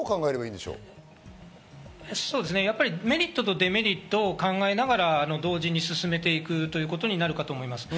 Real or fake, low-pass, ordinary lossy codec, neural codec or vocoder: real; none; none; none